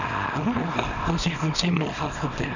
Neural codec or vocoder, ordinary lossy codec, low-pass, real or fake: codec, 24 kHz, 0.9 kbps, WavTokenizer, small release; none; 7.2 kHz; fake